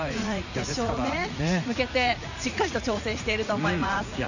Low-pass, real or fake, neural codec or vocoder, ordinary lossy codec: 7.2 kHz; fake; vocoder, 44.1 kHz, 80 mel bands, Vocos; none